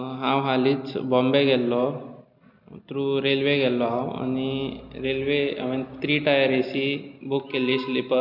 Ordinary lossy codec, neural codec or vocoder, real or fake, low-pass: none; none; real; 5.4 kHz